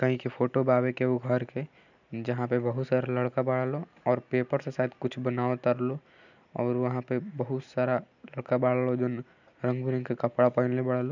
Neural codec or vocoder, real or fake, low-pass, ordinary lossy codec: none; real; 7.2 kHz; none